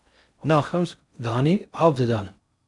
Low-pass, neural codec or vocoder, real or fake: 10.8 kHz; codec, 16 kHz in and 24 kHz out, 0.6 kbps, FocalCodec, streaming, 4096 codes; fake